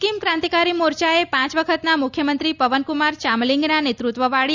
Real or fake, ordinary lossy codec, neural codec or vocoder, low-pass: real; Opus, 64 kbps; none; 7.2 kHz